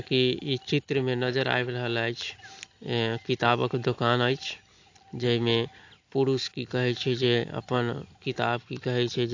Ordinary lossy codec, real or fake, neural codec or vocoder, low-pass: AAC, 48 kbps; real; none; 7.2 kHz